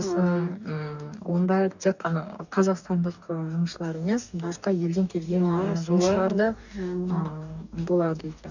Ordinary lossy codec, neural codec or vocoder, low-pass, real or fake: none; codec, 44.1 kHz, 2.6 kbps, DAC; 7.2 kHz; fake